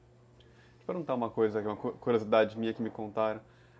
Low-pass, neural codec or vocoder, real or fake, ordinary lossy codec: none; none; real; none